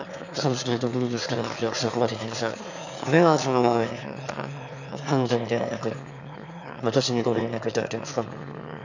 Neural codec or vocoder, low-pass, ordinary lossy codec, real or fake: autoencoder, 22.05 kHz, a latent of 192 numbers a frame, VITS, trained on one speaker; 7.2 kHz; none; fake